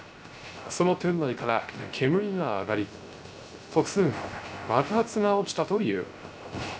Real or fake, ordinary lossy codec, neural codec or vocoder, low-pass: fake; none; codec, 16 kHz, 0.3 kbps, FocalCodec; none